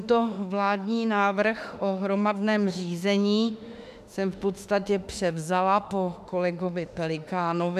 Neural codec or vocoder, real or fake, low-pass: autoencoder, 48 kHz, 32 numbers a frame, DAC-VAE, trained on Japanese speech; fake; 14.4 kHz